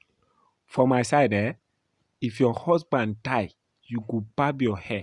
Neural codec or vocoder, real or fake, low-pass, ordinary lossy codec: none; real; 10.8 kHz; none